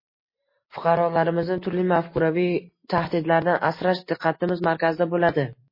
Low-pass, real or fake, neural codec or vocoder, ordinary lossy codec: 5.4 kHz; real; none; MP3, 24 kbps